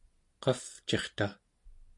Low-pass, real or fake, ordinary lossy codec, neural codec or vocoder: 10.8 kHz; real; MP3, 48 kbps; none